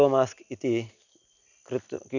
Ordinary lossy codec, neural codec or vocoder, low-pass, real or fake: none; none; 7.2 kHz; real